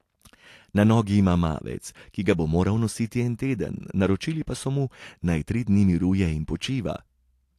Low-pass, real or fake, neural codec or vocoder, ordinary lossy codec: 14.4 kHz; real; none; AAC, 64 kbps